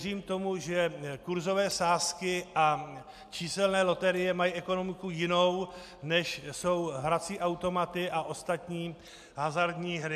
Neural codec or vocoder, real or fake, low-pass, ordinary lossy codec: none; real; 14.4 kHz; MP3, 96 kbps